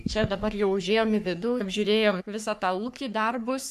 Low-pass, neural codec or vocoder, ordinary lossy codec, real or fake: 14.4 kHz; codec, 44.1 kHz, 3.4 kbps, Pupu-Codec; MP3, 96 kbps; fake